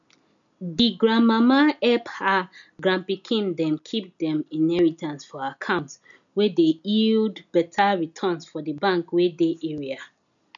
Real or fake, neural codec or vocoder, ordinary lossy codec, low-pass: real; none; none; 7.2 kHz